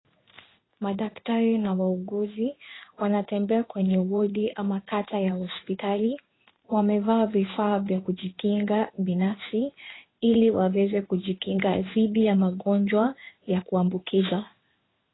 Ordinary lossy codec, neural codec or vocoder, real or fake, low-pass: AAC, 16 kbps; codec, 16 kHz in and 24 kHz out, 1 kbps, XY-Tokenizer; fake; 7.2 kHz